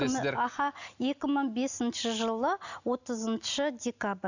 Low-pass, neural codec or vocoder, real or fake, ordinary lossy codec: 7.2 kHz; none; real; none